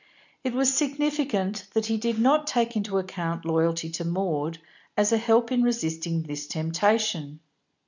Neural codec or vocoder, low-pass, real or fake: none; 7.2 kHz; real